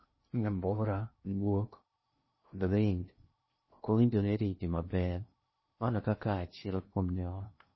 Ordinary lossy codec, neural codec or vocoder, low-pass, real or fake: MP3, 24 kbps; codec, 16 kHz in and 24 kHz out, 0.6 kbps, FocalCodec, streaming, 2048 codes; 7.2 kHz; fake